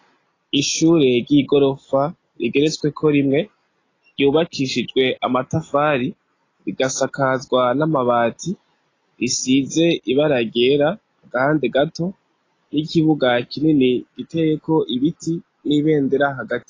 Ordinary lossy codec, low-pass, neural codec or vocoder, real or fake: AAC, 32 kbps; 7.2 kHz; none; real